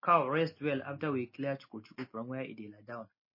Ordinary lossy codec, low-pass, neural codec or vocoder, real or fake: MP3, 24 kbps; 5.4 kHz; none; real